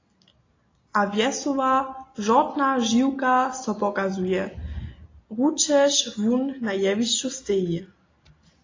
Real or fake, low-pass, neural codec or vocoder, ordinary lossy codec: real; 7.2 kHz; none; AAC, 32 kbps